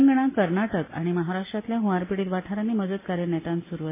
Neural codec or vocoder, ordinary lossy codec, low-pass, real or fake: none; MP3, 16 kbps; 3.6 kHz; real